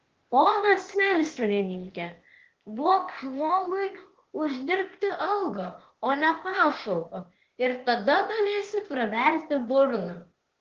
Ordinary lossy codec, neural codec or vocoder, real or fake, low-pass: Opus, 16 kbps; codec, 16 kHz, 0.8 kbps, ZipCodec; fake; 7.2 kHz